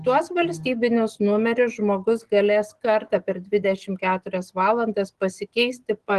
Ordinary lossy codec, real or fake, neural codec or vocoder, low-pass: Opus, 16 kbps; real; none; 10.8 kHz